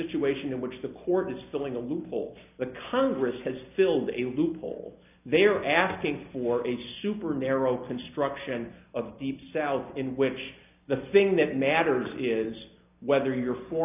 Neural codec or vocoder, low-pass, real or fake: none; 3.6 kHz; real